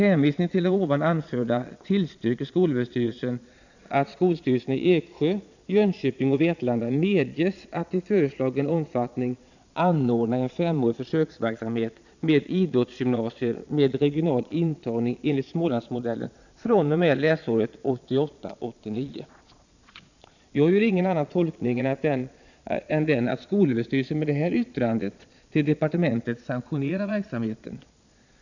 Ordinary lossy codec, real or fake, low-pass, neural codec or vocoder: none; fake; 7.2 kHz; vocoder, 22.05 kHz, 80 mel bands, Vocos